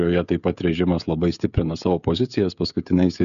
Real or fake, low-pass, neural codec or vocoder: fake; 7.2 kHz; codec, 16 kHz, 16 kbps, FreqCodec, smaller model